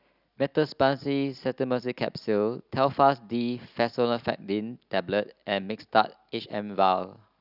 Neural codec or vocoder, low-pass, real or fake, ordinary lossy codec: none; 5.4 kHz; real; none